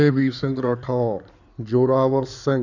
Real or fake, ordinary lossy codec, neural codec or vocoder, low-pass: fake; none; codec, 16 kHz in and 24 kHz out, 2.2 kbps, FireRedTTS-2 codec; 7.2 kHz